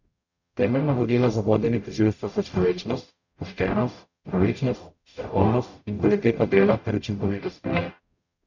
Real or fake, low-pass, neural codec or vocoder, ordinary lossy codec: fake; 7.2 kHz; codec, 44.1 kHz, 0.9 kbps, DAC; none